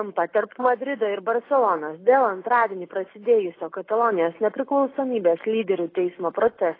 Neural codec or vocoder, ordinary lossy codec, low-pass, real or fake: none; AAC, 24 kbps; 5.4 kHz; real